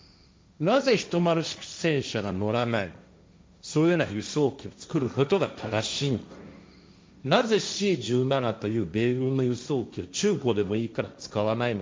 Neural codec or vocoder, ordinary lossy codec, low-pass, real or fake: codec, 16 kHz, 1.1 kbps, Voila-Tokenizer; none; none; fake